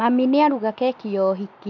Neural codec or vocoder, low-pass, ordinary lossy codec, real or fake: none; 7.2 kHz; none; real